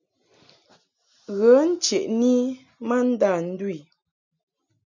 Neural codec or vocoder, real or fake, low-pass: none; real; 7.2 kHz